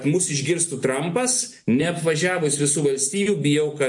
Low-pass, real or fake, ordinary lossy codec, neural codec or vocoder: 10.8 kHz; real; MP3, 48 kbps; none